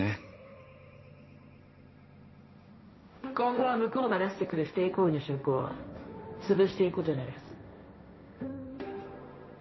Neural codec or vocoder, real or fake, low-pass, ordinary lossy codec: codec, 16 kHz, 1.1 kbps, Voila-Tokenizer; fake; 7.2 kHz; MP3, 24 kbps